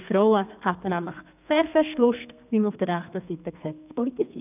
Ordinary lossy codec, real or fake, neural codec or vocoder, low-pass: none; fake; codec, 32 kHz, 1.9 kbps, SNAC; 3.6 kHz